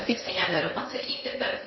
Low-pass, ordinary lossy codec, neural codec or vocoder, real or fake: 7.2 kHz; MP3, 24 kbps; codec, 16 kHz in and 24 kHz out, 0.6 kbps, FocalCodec, streaming, 2048 codes; fake